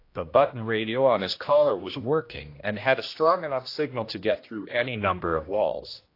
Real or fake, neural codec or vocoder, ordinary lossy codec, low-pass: fake; codec, 16 kHz, 1 kbps, X-Codec, HuBERT features, trained on general audio; AAC, 32 kbps; 5.4 kHz